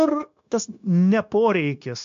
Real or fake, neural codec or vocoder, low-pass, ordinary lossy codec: fake; codec, 16 kHz, 0.9 kbps, LongCat-Audio-Codec; 7.2 kHz; AAC, 64 kbps